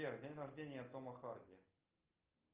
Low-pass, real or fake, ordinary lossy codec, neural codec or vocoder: 3.6 kHz; real; Opus, 24 kbps; none